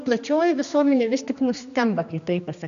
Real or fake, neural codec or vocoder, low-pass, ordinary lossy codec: fake; codec, 16 kHz, 2 kbps, X-Codec, HuBERT features, trained on general audio; 7.2 kHz; AAC, 96 kbps